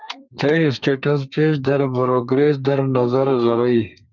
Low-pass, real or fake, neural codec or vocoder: 7.2 kHz; fake; codec, 32 kHz, 1.9 kbps, SNAC